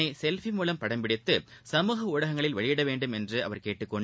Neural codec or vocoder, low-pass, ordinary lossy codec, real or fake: none; none; none; real